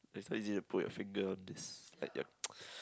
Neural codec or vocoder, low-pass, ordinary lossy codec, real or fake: none; none; none; real